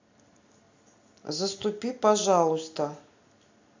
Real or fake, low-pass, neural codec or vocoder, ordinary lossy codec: real; 7.2 kHz; none; AAC, 48 kbps